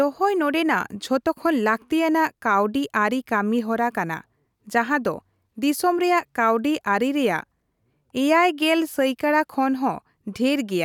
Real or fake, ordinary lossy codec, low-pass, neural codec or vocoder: real; none; 19.8 kHz; none